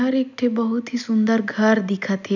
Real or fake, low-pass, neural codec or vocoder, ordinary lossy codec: real; 7.2 kHz; none; none